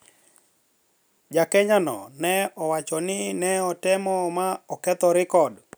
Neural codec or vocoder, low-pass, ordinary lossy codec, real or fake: none; none; none; real